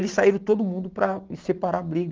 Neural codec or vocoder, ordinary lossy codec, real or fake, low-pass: none; Opus, 32 kbps; real; 7.2 kHz